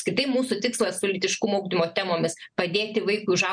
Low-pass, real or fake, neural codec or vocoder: 9.9 kHz; real; none